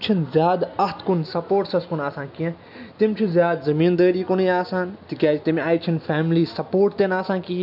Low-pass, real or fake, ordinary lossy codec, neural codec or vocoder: 5.4 kHz; real; none; none